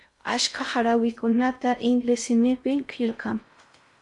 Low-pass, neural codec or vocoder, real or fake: 10.8 kHz; codec, 16 kHz in and 24 kHz out, 0.6 kbps, FocalCodec, streaming, 4096 codes; fake